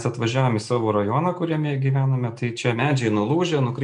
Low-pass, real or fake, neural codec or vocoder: 9.9 kHz; real; none